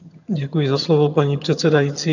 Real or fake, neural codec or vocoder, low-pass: fake; vocoder, 22.05 kHz, 80 mel bands, HiFi-GAN; 7.2 kHz